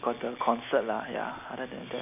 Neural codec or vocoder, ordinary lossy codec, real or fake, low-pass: none; none; real; 3.6 kHz